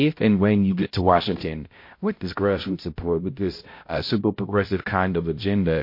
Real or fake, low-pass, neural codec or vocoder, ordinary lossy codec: fake; 5.4 kHz; codec, 16 kHz, 0.5 kbps, X-Codec, HuBERT features, trained on balanced general audio; MP3, 32 kbps